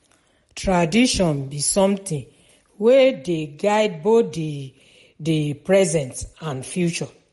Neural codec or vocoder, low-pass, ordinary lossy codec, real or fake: none; 19.8 kHz; MP3, 48 kbps; real